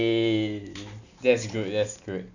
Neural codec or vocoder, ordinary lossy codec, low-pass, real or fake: none; none; 7.2 kHz; real